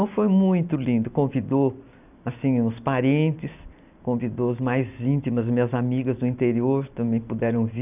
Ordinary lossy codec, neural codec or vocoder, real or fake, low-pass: none; none; real; 3.6 kHz